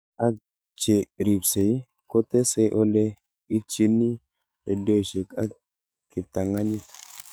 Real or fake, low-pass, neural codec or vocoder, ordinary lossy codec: fake; none; codec, 44.1 kHz, 7.8 kbps, DAC; none